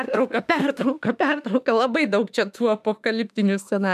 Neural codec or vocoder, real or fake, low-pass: autoencoder, 48 kHz, 32 numbers a frame, DAC-VAE, trained on Japanese speech; fake; 14.4 kHz